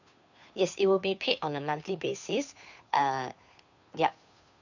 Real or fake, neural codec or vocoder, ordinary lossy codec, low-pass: fake; codec, 16 kHz, 2 kbps, FunCodec, trained on Chinese and English, 25 frames a second; none; 7.2 kHz